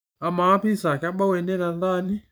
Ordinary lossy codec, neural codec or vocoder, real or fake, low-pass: none; vocoder, 44.1 kHz, 128 mel bands every 512 samples, BigVGAN v2; fake; none